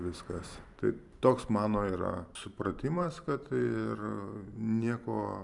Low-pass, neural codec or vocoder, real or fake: 10.8 kHz; vocoder, 44.1 kHz, 128 mel bands every 512 samples, BigVGAN v2; fake